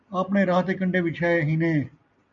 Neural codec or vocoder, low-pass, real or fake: none; 7.2 kHz; real